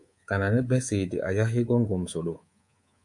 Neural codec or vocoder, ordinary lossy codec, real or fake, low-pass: codec, 24 kHz, 3.1 kbps, DualCodec; MP3, 64 kbps; fake; 10.8 kHz